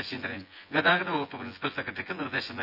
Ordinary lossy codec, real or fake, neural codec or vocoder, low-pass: none; fake; vocoder, 24 kHz, 100 mel bands, Vocos; 5.4 kHz